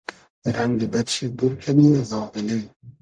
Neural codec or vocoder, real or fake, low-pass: codec, 44.1 kHz, 0.9 kbps, DAC; fake; 9.9 kHz